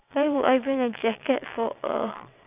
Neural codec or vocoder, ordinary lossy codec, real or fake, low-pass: vocoder, 22.05 kHz, 80 mel bands, WaveNeXt; none; fake; 3.6 kHz